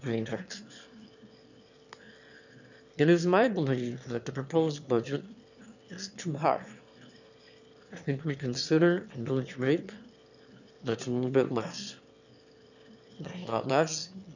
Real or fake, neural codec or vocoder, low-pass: fake; autoencoder, 22.05 kHz, a latent of 192 numbers a frame, VITS, trained on one speaker; 7.2 kHz